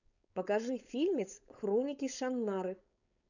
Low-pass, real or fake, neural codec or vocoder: 7.2 kHz; fake; codec, 16 kHz, 4.8 kbps, FACodec